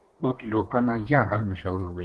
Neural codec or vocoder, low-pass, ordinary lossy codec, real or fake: codec, 24 kHz, 1 kbps, SNAC; 10.8 kHz; Opus, 16 kbps; fake